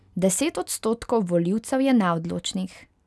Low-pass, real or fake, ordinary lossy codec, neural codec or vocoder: none; real; none; none